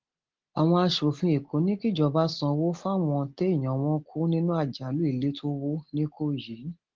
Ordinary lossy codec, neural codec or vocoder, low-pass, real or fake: Opus, 16 kbps; none; 7.2 kHz; real